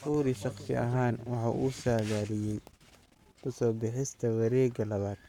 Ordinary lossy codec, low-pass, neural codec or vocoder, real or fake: none; 19.8 kHz; codec, 44.1 kHz, 7.8 kbps, Pupu-Codec; fake